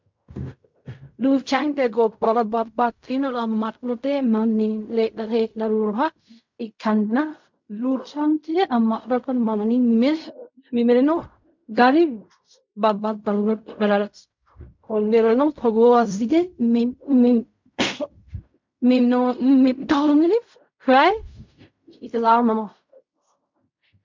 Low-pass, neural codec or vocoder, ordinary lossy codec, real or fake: 7.2 kHz; codec, 16 kHz in and 24 kHz out, 0.4 kbps, LongCat-Audio-Codec, fine tuned four codebook decoder; MP3, 64 kbps; fake